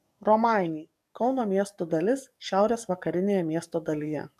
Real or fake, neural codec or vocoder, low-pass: fake; codec, 44.1 kHz, 7.8 kbps, DAC; 14.4 kHz